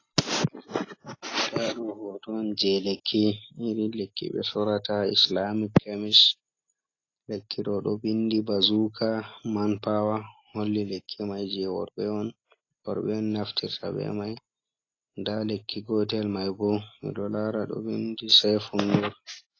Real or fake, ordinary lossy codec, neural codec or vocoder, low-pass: real; AAC, 32 kbps; none; 7.2 kHz